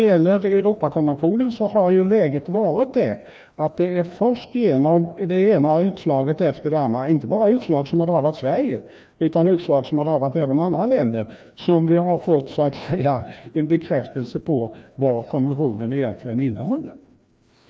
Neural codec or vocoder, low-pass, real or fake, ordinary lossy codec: codec, 16 kHz, 1 kbps, FreqCodec, larger model; none; fake; none